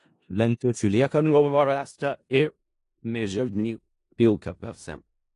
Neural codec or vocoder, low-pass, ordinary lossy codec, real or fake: codec, 16 kHz in and 24 kHz out, 0.4 kbps, LongCat-Audio-Codec, four codebook decoder; 10.8 kHz; AAC, 48 kbps; fake